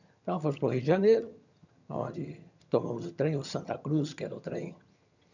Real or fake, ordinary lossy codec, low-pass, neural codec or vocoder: fake; none; 7.2 kHz; vocoder, 22.05 kHz, 80 mel bands, HiFi-GAN